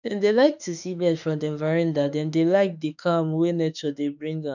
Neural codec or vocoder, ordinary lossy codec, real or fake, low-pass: autoencoder, 48 kHz, 32 numbers a frame, DAC-VAE, trained on Japanese speech; none; fake; 7.2 kHz